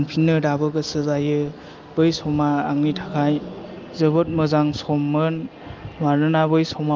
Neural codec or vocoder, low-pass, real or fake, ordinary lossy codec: none; 7.2 kHz; real; Opus, 32 kbps